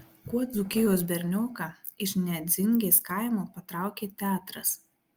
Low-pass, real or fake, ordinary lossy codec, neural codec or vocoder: 19.8 kHz; real; Opus, 32 kbps; none